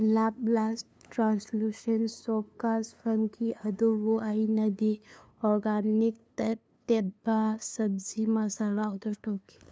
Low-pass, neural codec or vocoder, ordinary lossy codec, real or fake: none; codec, 16 kHz, 2 kbps, FunCodec, trained on LibriTTS, 25 frames a second; none; fake